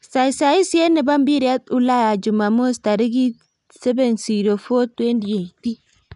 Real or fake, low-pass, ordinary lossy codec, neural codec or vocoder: real; 10.8 kHz; none; none